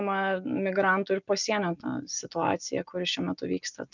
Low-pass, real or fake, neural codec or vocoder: 7.2 kHz; real; none